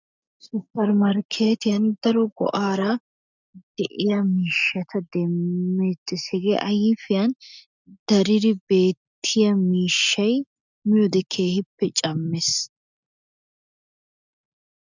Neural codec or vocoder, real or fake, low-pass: none; real; 7.2 kHz